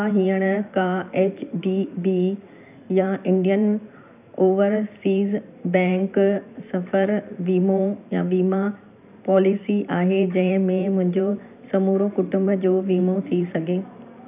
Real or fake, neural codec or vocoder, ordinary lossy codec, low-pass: fake; vocoder, 44.1 kHz, 128 mel bands every 512 samples, BigVGAN v2; none; 3.6 kHz